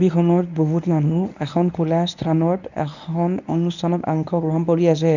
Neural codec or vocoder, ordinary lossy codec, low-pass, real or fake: codec, 24 kHz, 0.9 kbps, WavTokenizer, medium speech release version 1; none; 7.2 kHz; fake